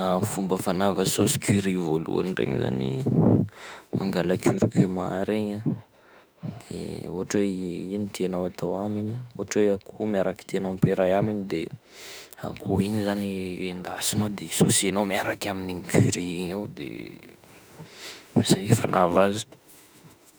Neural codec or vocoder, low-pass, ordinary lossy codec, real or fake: autoencoder, 48 kHz, 32 numbers a frame, DAC-VAE, trained on Japanese speech; none; none; fake